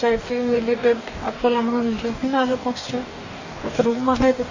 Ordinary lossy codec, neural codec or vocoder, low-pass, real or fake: Opus, 64 kbps; codec, 44.1 kHz, 2.6 kbps, DAC; 7.2 kHz; fake